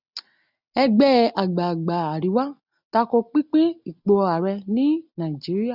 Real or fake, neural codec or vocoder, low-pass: real; none; 5.4 kHz